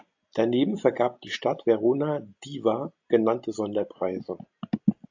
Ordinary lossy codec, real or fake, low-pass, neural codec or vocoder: AAC, 48 kbps; real; 7.2 kHz; none